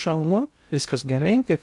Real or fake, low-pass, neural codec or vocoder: fake; 10.8 kHz; codec, 16 kHz in and 24 kHz out, 0.6 kbps, FocalCodec, streaming, 2048 codes